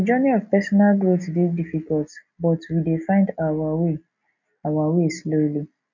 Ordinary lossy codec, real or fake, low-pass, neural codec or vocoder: none; real; 7.2 kHz; none